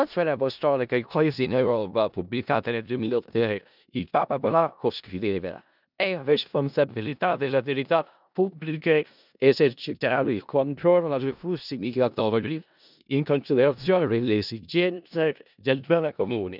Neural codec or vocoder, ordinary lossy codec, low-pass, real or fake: codec, 16 kHz in and 24 kHz out, 0.4 kbps, LongCat-Audio-Codec, four codebook decoder; none; 5.4 kHz; fake